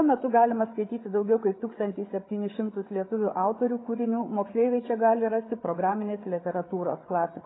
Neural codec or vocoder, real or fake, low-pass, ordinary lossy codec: codec, 16 kHz, 8 kbps, FreqCodec, larger model; fake; 7.2 kHz; AAC, 16 kbps